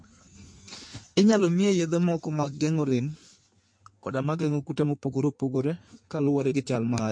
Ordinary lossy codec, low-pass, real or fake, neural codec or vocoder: MP3, 48 kbps; 9.9 kHz; fake; codec, 16 kHz in and 24 kHz out, 1.1 kbps, FireRedTTS-2 codec